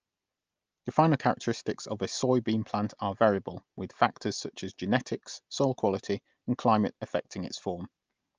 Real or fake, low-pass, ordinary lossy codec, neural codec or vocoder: real; 7.2 kHz; Opus, 24 kbps; none